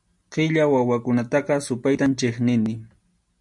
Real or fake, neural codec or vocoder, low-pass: real; none; 10.8 kHz